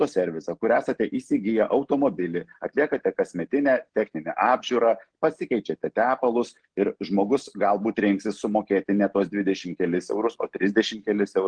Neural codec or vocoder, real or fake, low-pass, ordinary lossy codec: none; real; 9.9 kHz; Opus, 16 kbps